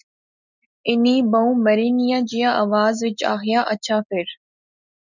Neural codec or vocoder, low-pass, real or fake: none; 7.2 kHz; real